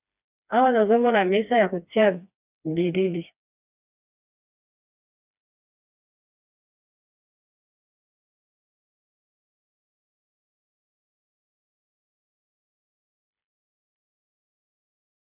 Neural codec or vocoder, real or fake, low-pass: codec, 16 kHz, 2 kbps, FreqCodec, smaller model; fake; 3.6 kHz